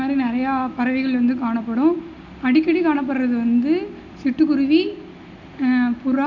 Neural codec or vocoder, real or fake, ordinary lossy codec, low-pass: none; real; none; 7.2 kHz